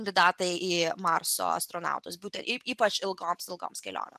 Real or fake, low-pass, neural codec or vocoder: real; 14.4 kHz; none